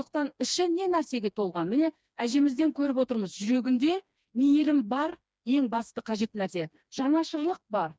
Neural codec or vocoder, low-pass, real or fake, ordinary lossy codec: codec, 16 kHz, 2 kbps, FreqCodec, smaller model; none; fake; none